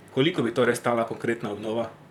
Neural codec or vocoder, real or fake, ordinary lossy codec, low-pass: vocoder, 44.1 kHz, 128 mel bands, Pupu-Vocoder; fake; none; 19.8 kHz